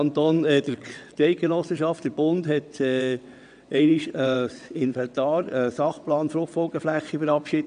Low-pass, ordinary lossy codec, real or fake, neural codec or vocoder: 9.9 kHz; none; fake; vocoder, 22.05 kHz, 80 mel bands, WaveNeXt